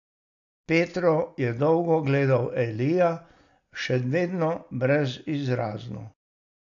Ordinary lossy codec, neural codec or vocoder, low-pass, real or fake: AAC, 64 kbps; none; 7.2 kHz; real